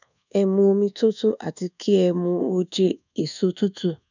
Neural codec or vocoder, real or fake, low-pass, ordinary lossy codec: codec, 24 kHz, 1.2 kbps, DualCodec; fake; 7.2 kHz; none